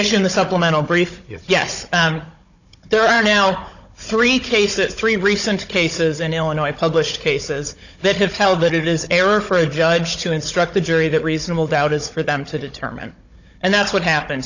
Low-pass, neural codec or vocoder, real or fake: 7.2 kHz; codec, 16 kHz, 16 kbps, FunCodec, trained on Chinese and English, 50 frames a second; fake